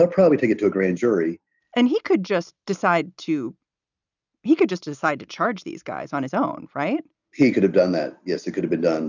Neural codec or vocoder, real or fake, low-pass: none; real; 7.2 kHz